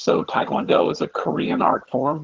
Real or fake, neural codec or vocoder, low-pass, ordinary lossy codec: fake; vocoder, 22.05 kHz, 80 mel bands, HiFi-GAN; 7.2 kHz; Opus, 16 kbps